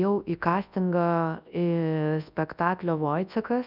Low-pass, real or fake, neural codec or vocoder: 5.4 kHz; fake; codec, 24 kHz, 0.9 kbps, WavTokenizer, large speech release